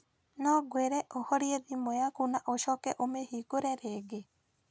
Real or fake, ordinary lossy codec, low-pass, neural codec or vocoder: real; none; none; none